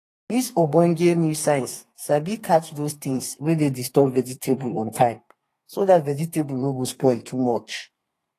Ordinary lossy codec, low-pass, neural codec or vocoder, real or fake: AAC, 48 kbps; 14.4 kHz; codec, 32 kHz, 1.9 kbps, SNAC; fake